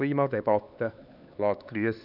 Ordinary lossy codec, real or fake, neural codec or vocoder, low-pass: none; fake; codec, 16 kHz, 4 kbps, X-Codec, HuBERT features, trained on LibriSpeech; 5.4 kHz